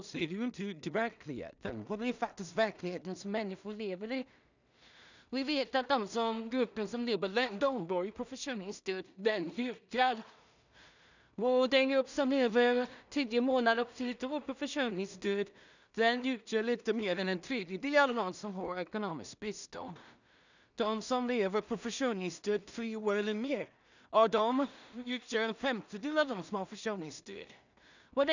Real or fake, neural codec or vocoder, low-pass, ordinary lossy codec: fake; codec, 16 kHz in and 24 kHz out, 0.4 kbps, LongCat-Audio-Codec, two codebook decoder; 7.2 kHz; none